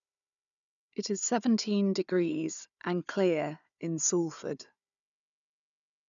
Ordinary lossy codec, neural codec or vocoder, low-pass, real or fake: none; codec, 16 kHz, 4 kbps, FunCodec, trained on Chinese and English, 50 frames a second; 7.2 kHz; fake